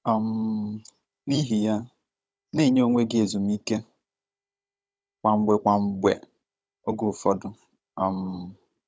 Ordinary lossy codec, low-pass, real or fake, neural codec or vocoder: none; none; fake; codec, 16 kHz, 16 kbps, FunCodec, trained on Chinese and English, 50 frames a second